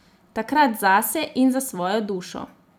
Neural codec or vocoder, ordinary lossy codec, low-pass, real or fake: vocoder, 44.1 kHz, 128 mel bands every 256 samples, BigVGAN v2; none; none; fake